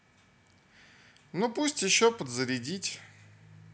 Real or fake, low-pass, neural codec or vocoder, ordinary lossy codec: real; none; none; none